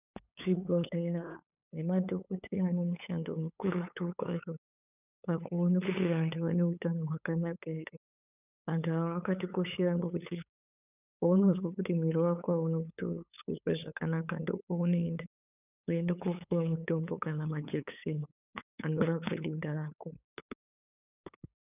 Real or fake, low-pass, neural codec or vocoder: fake; 3.6 kHz; codec, 16 kHz, 8 kbps, FunCodec, trained on LibriTTS, 25 frames a second